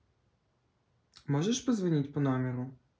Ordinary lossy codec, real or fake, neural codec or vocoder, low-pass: none; real; none; none